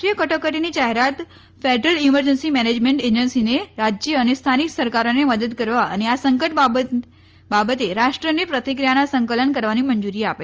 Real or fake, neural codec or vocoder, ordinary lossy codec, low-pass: real; none; Opus, 24 kbps; 7.2 kHz